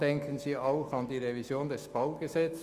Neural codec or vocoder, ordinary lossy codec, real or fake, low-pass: autoencoder, 48 kHz, 128 numbers a frame, DAC-VAE, trained on Japanese speech; Opus, 32 kbps; fake; 14.4 kHz